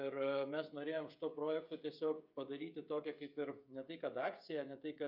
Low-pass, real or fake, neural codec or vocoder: 5.4 kHz; fake; codec, 16 kHz, 16 kbps, FreqCodec, smaller model